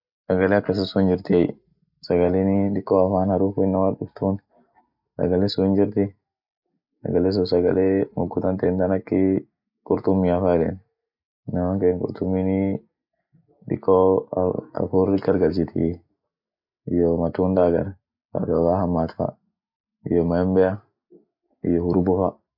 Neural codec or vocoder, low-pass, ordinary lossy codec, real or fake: none; 5.4 kHz; none; real